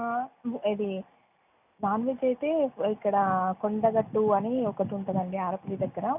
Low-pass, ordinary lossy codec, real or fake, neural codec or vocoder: 3.6 kHz; none; real; none